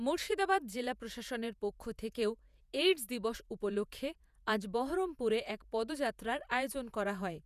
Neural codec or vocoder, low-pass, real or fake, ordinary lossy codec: vocoder, 48 kHz, 128 mel bands, Vocos; 14.4 kHz; fake; none